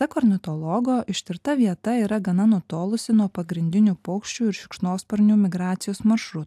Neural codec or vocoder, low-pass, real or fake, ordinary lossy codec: none; 14.4 kHz; real; AAC, 96 kbps